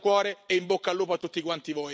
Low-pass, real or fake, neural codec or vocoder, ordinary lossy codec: none; real; none; none